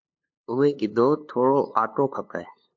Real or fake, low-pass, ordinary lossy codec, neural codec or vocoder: fake; 7.2 kHz; MP3, 48 kbps; codec, 16 kHz, 2 kbps, FunCodec, trained on LibriTTS, 25 frames a second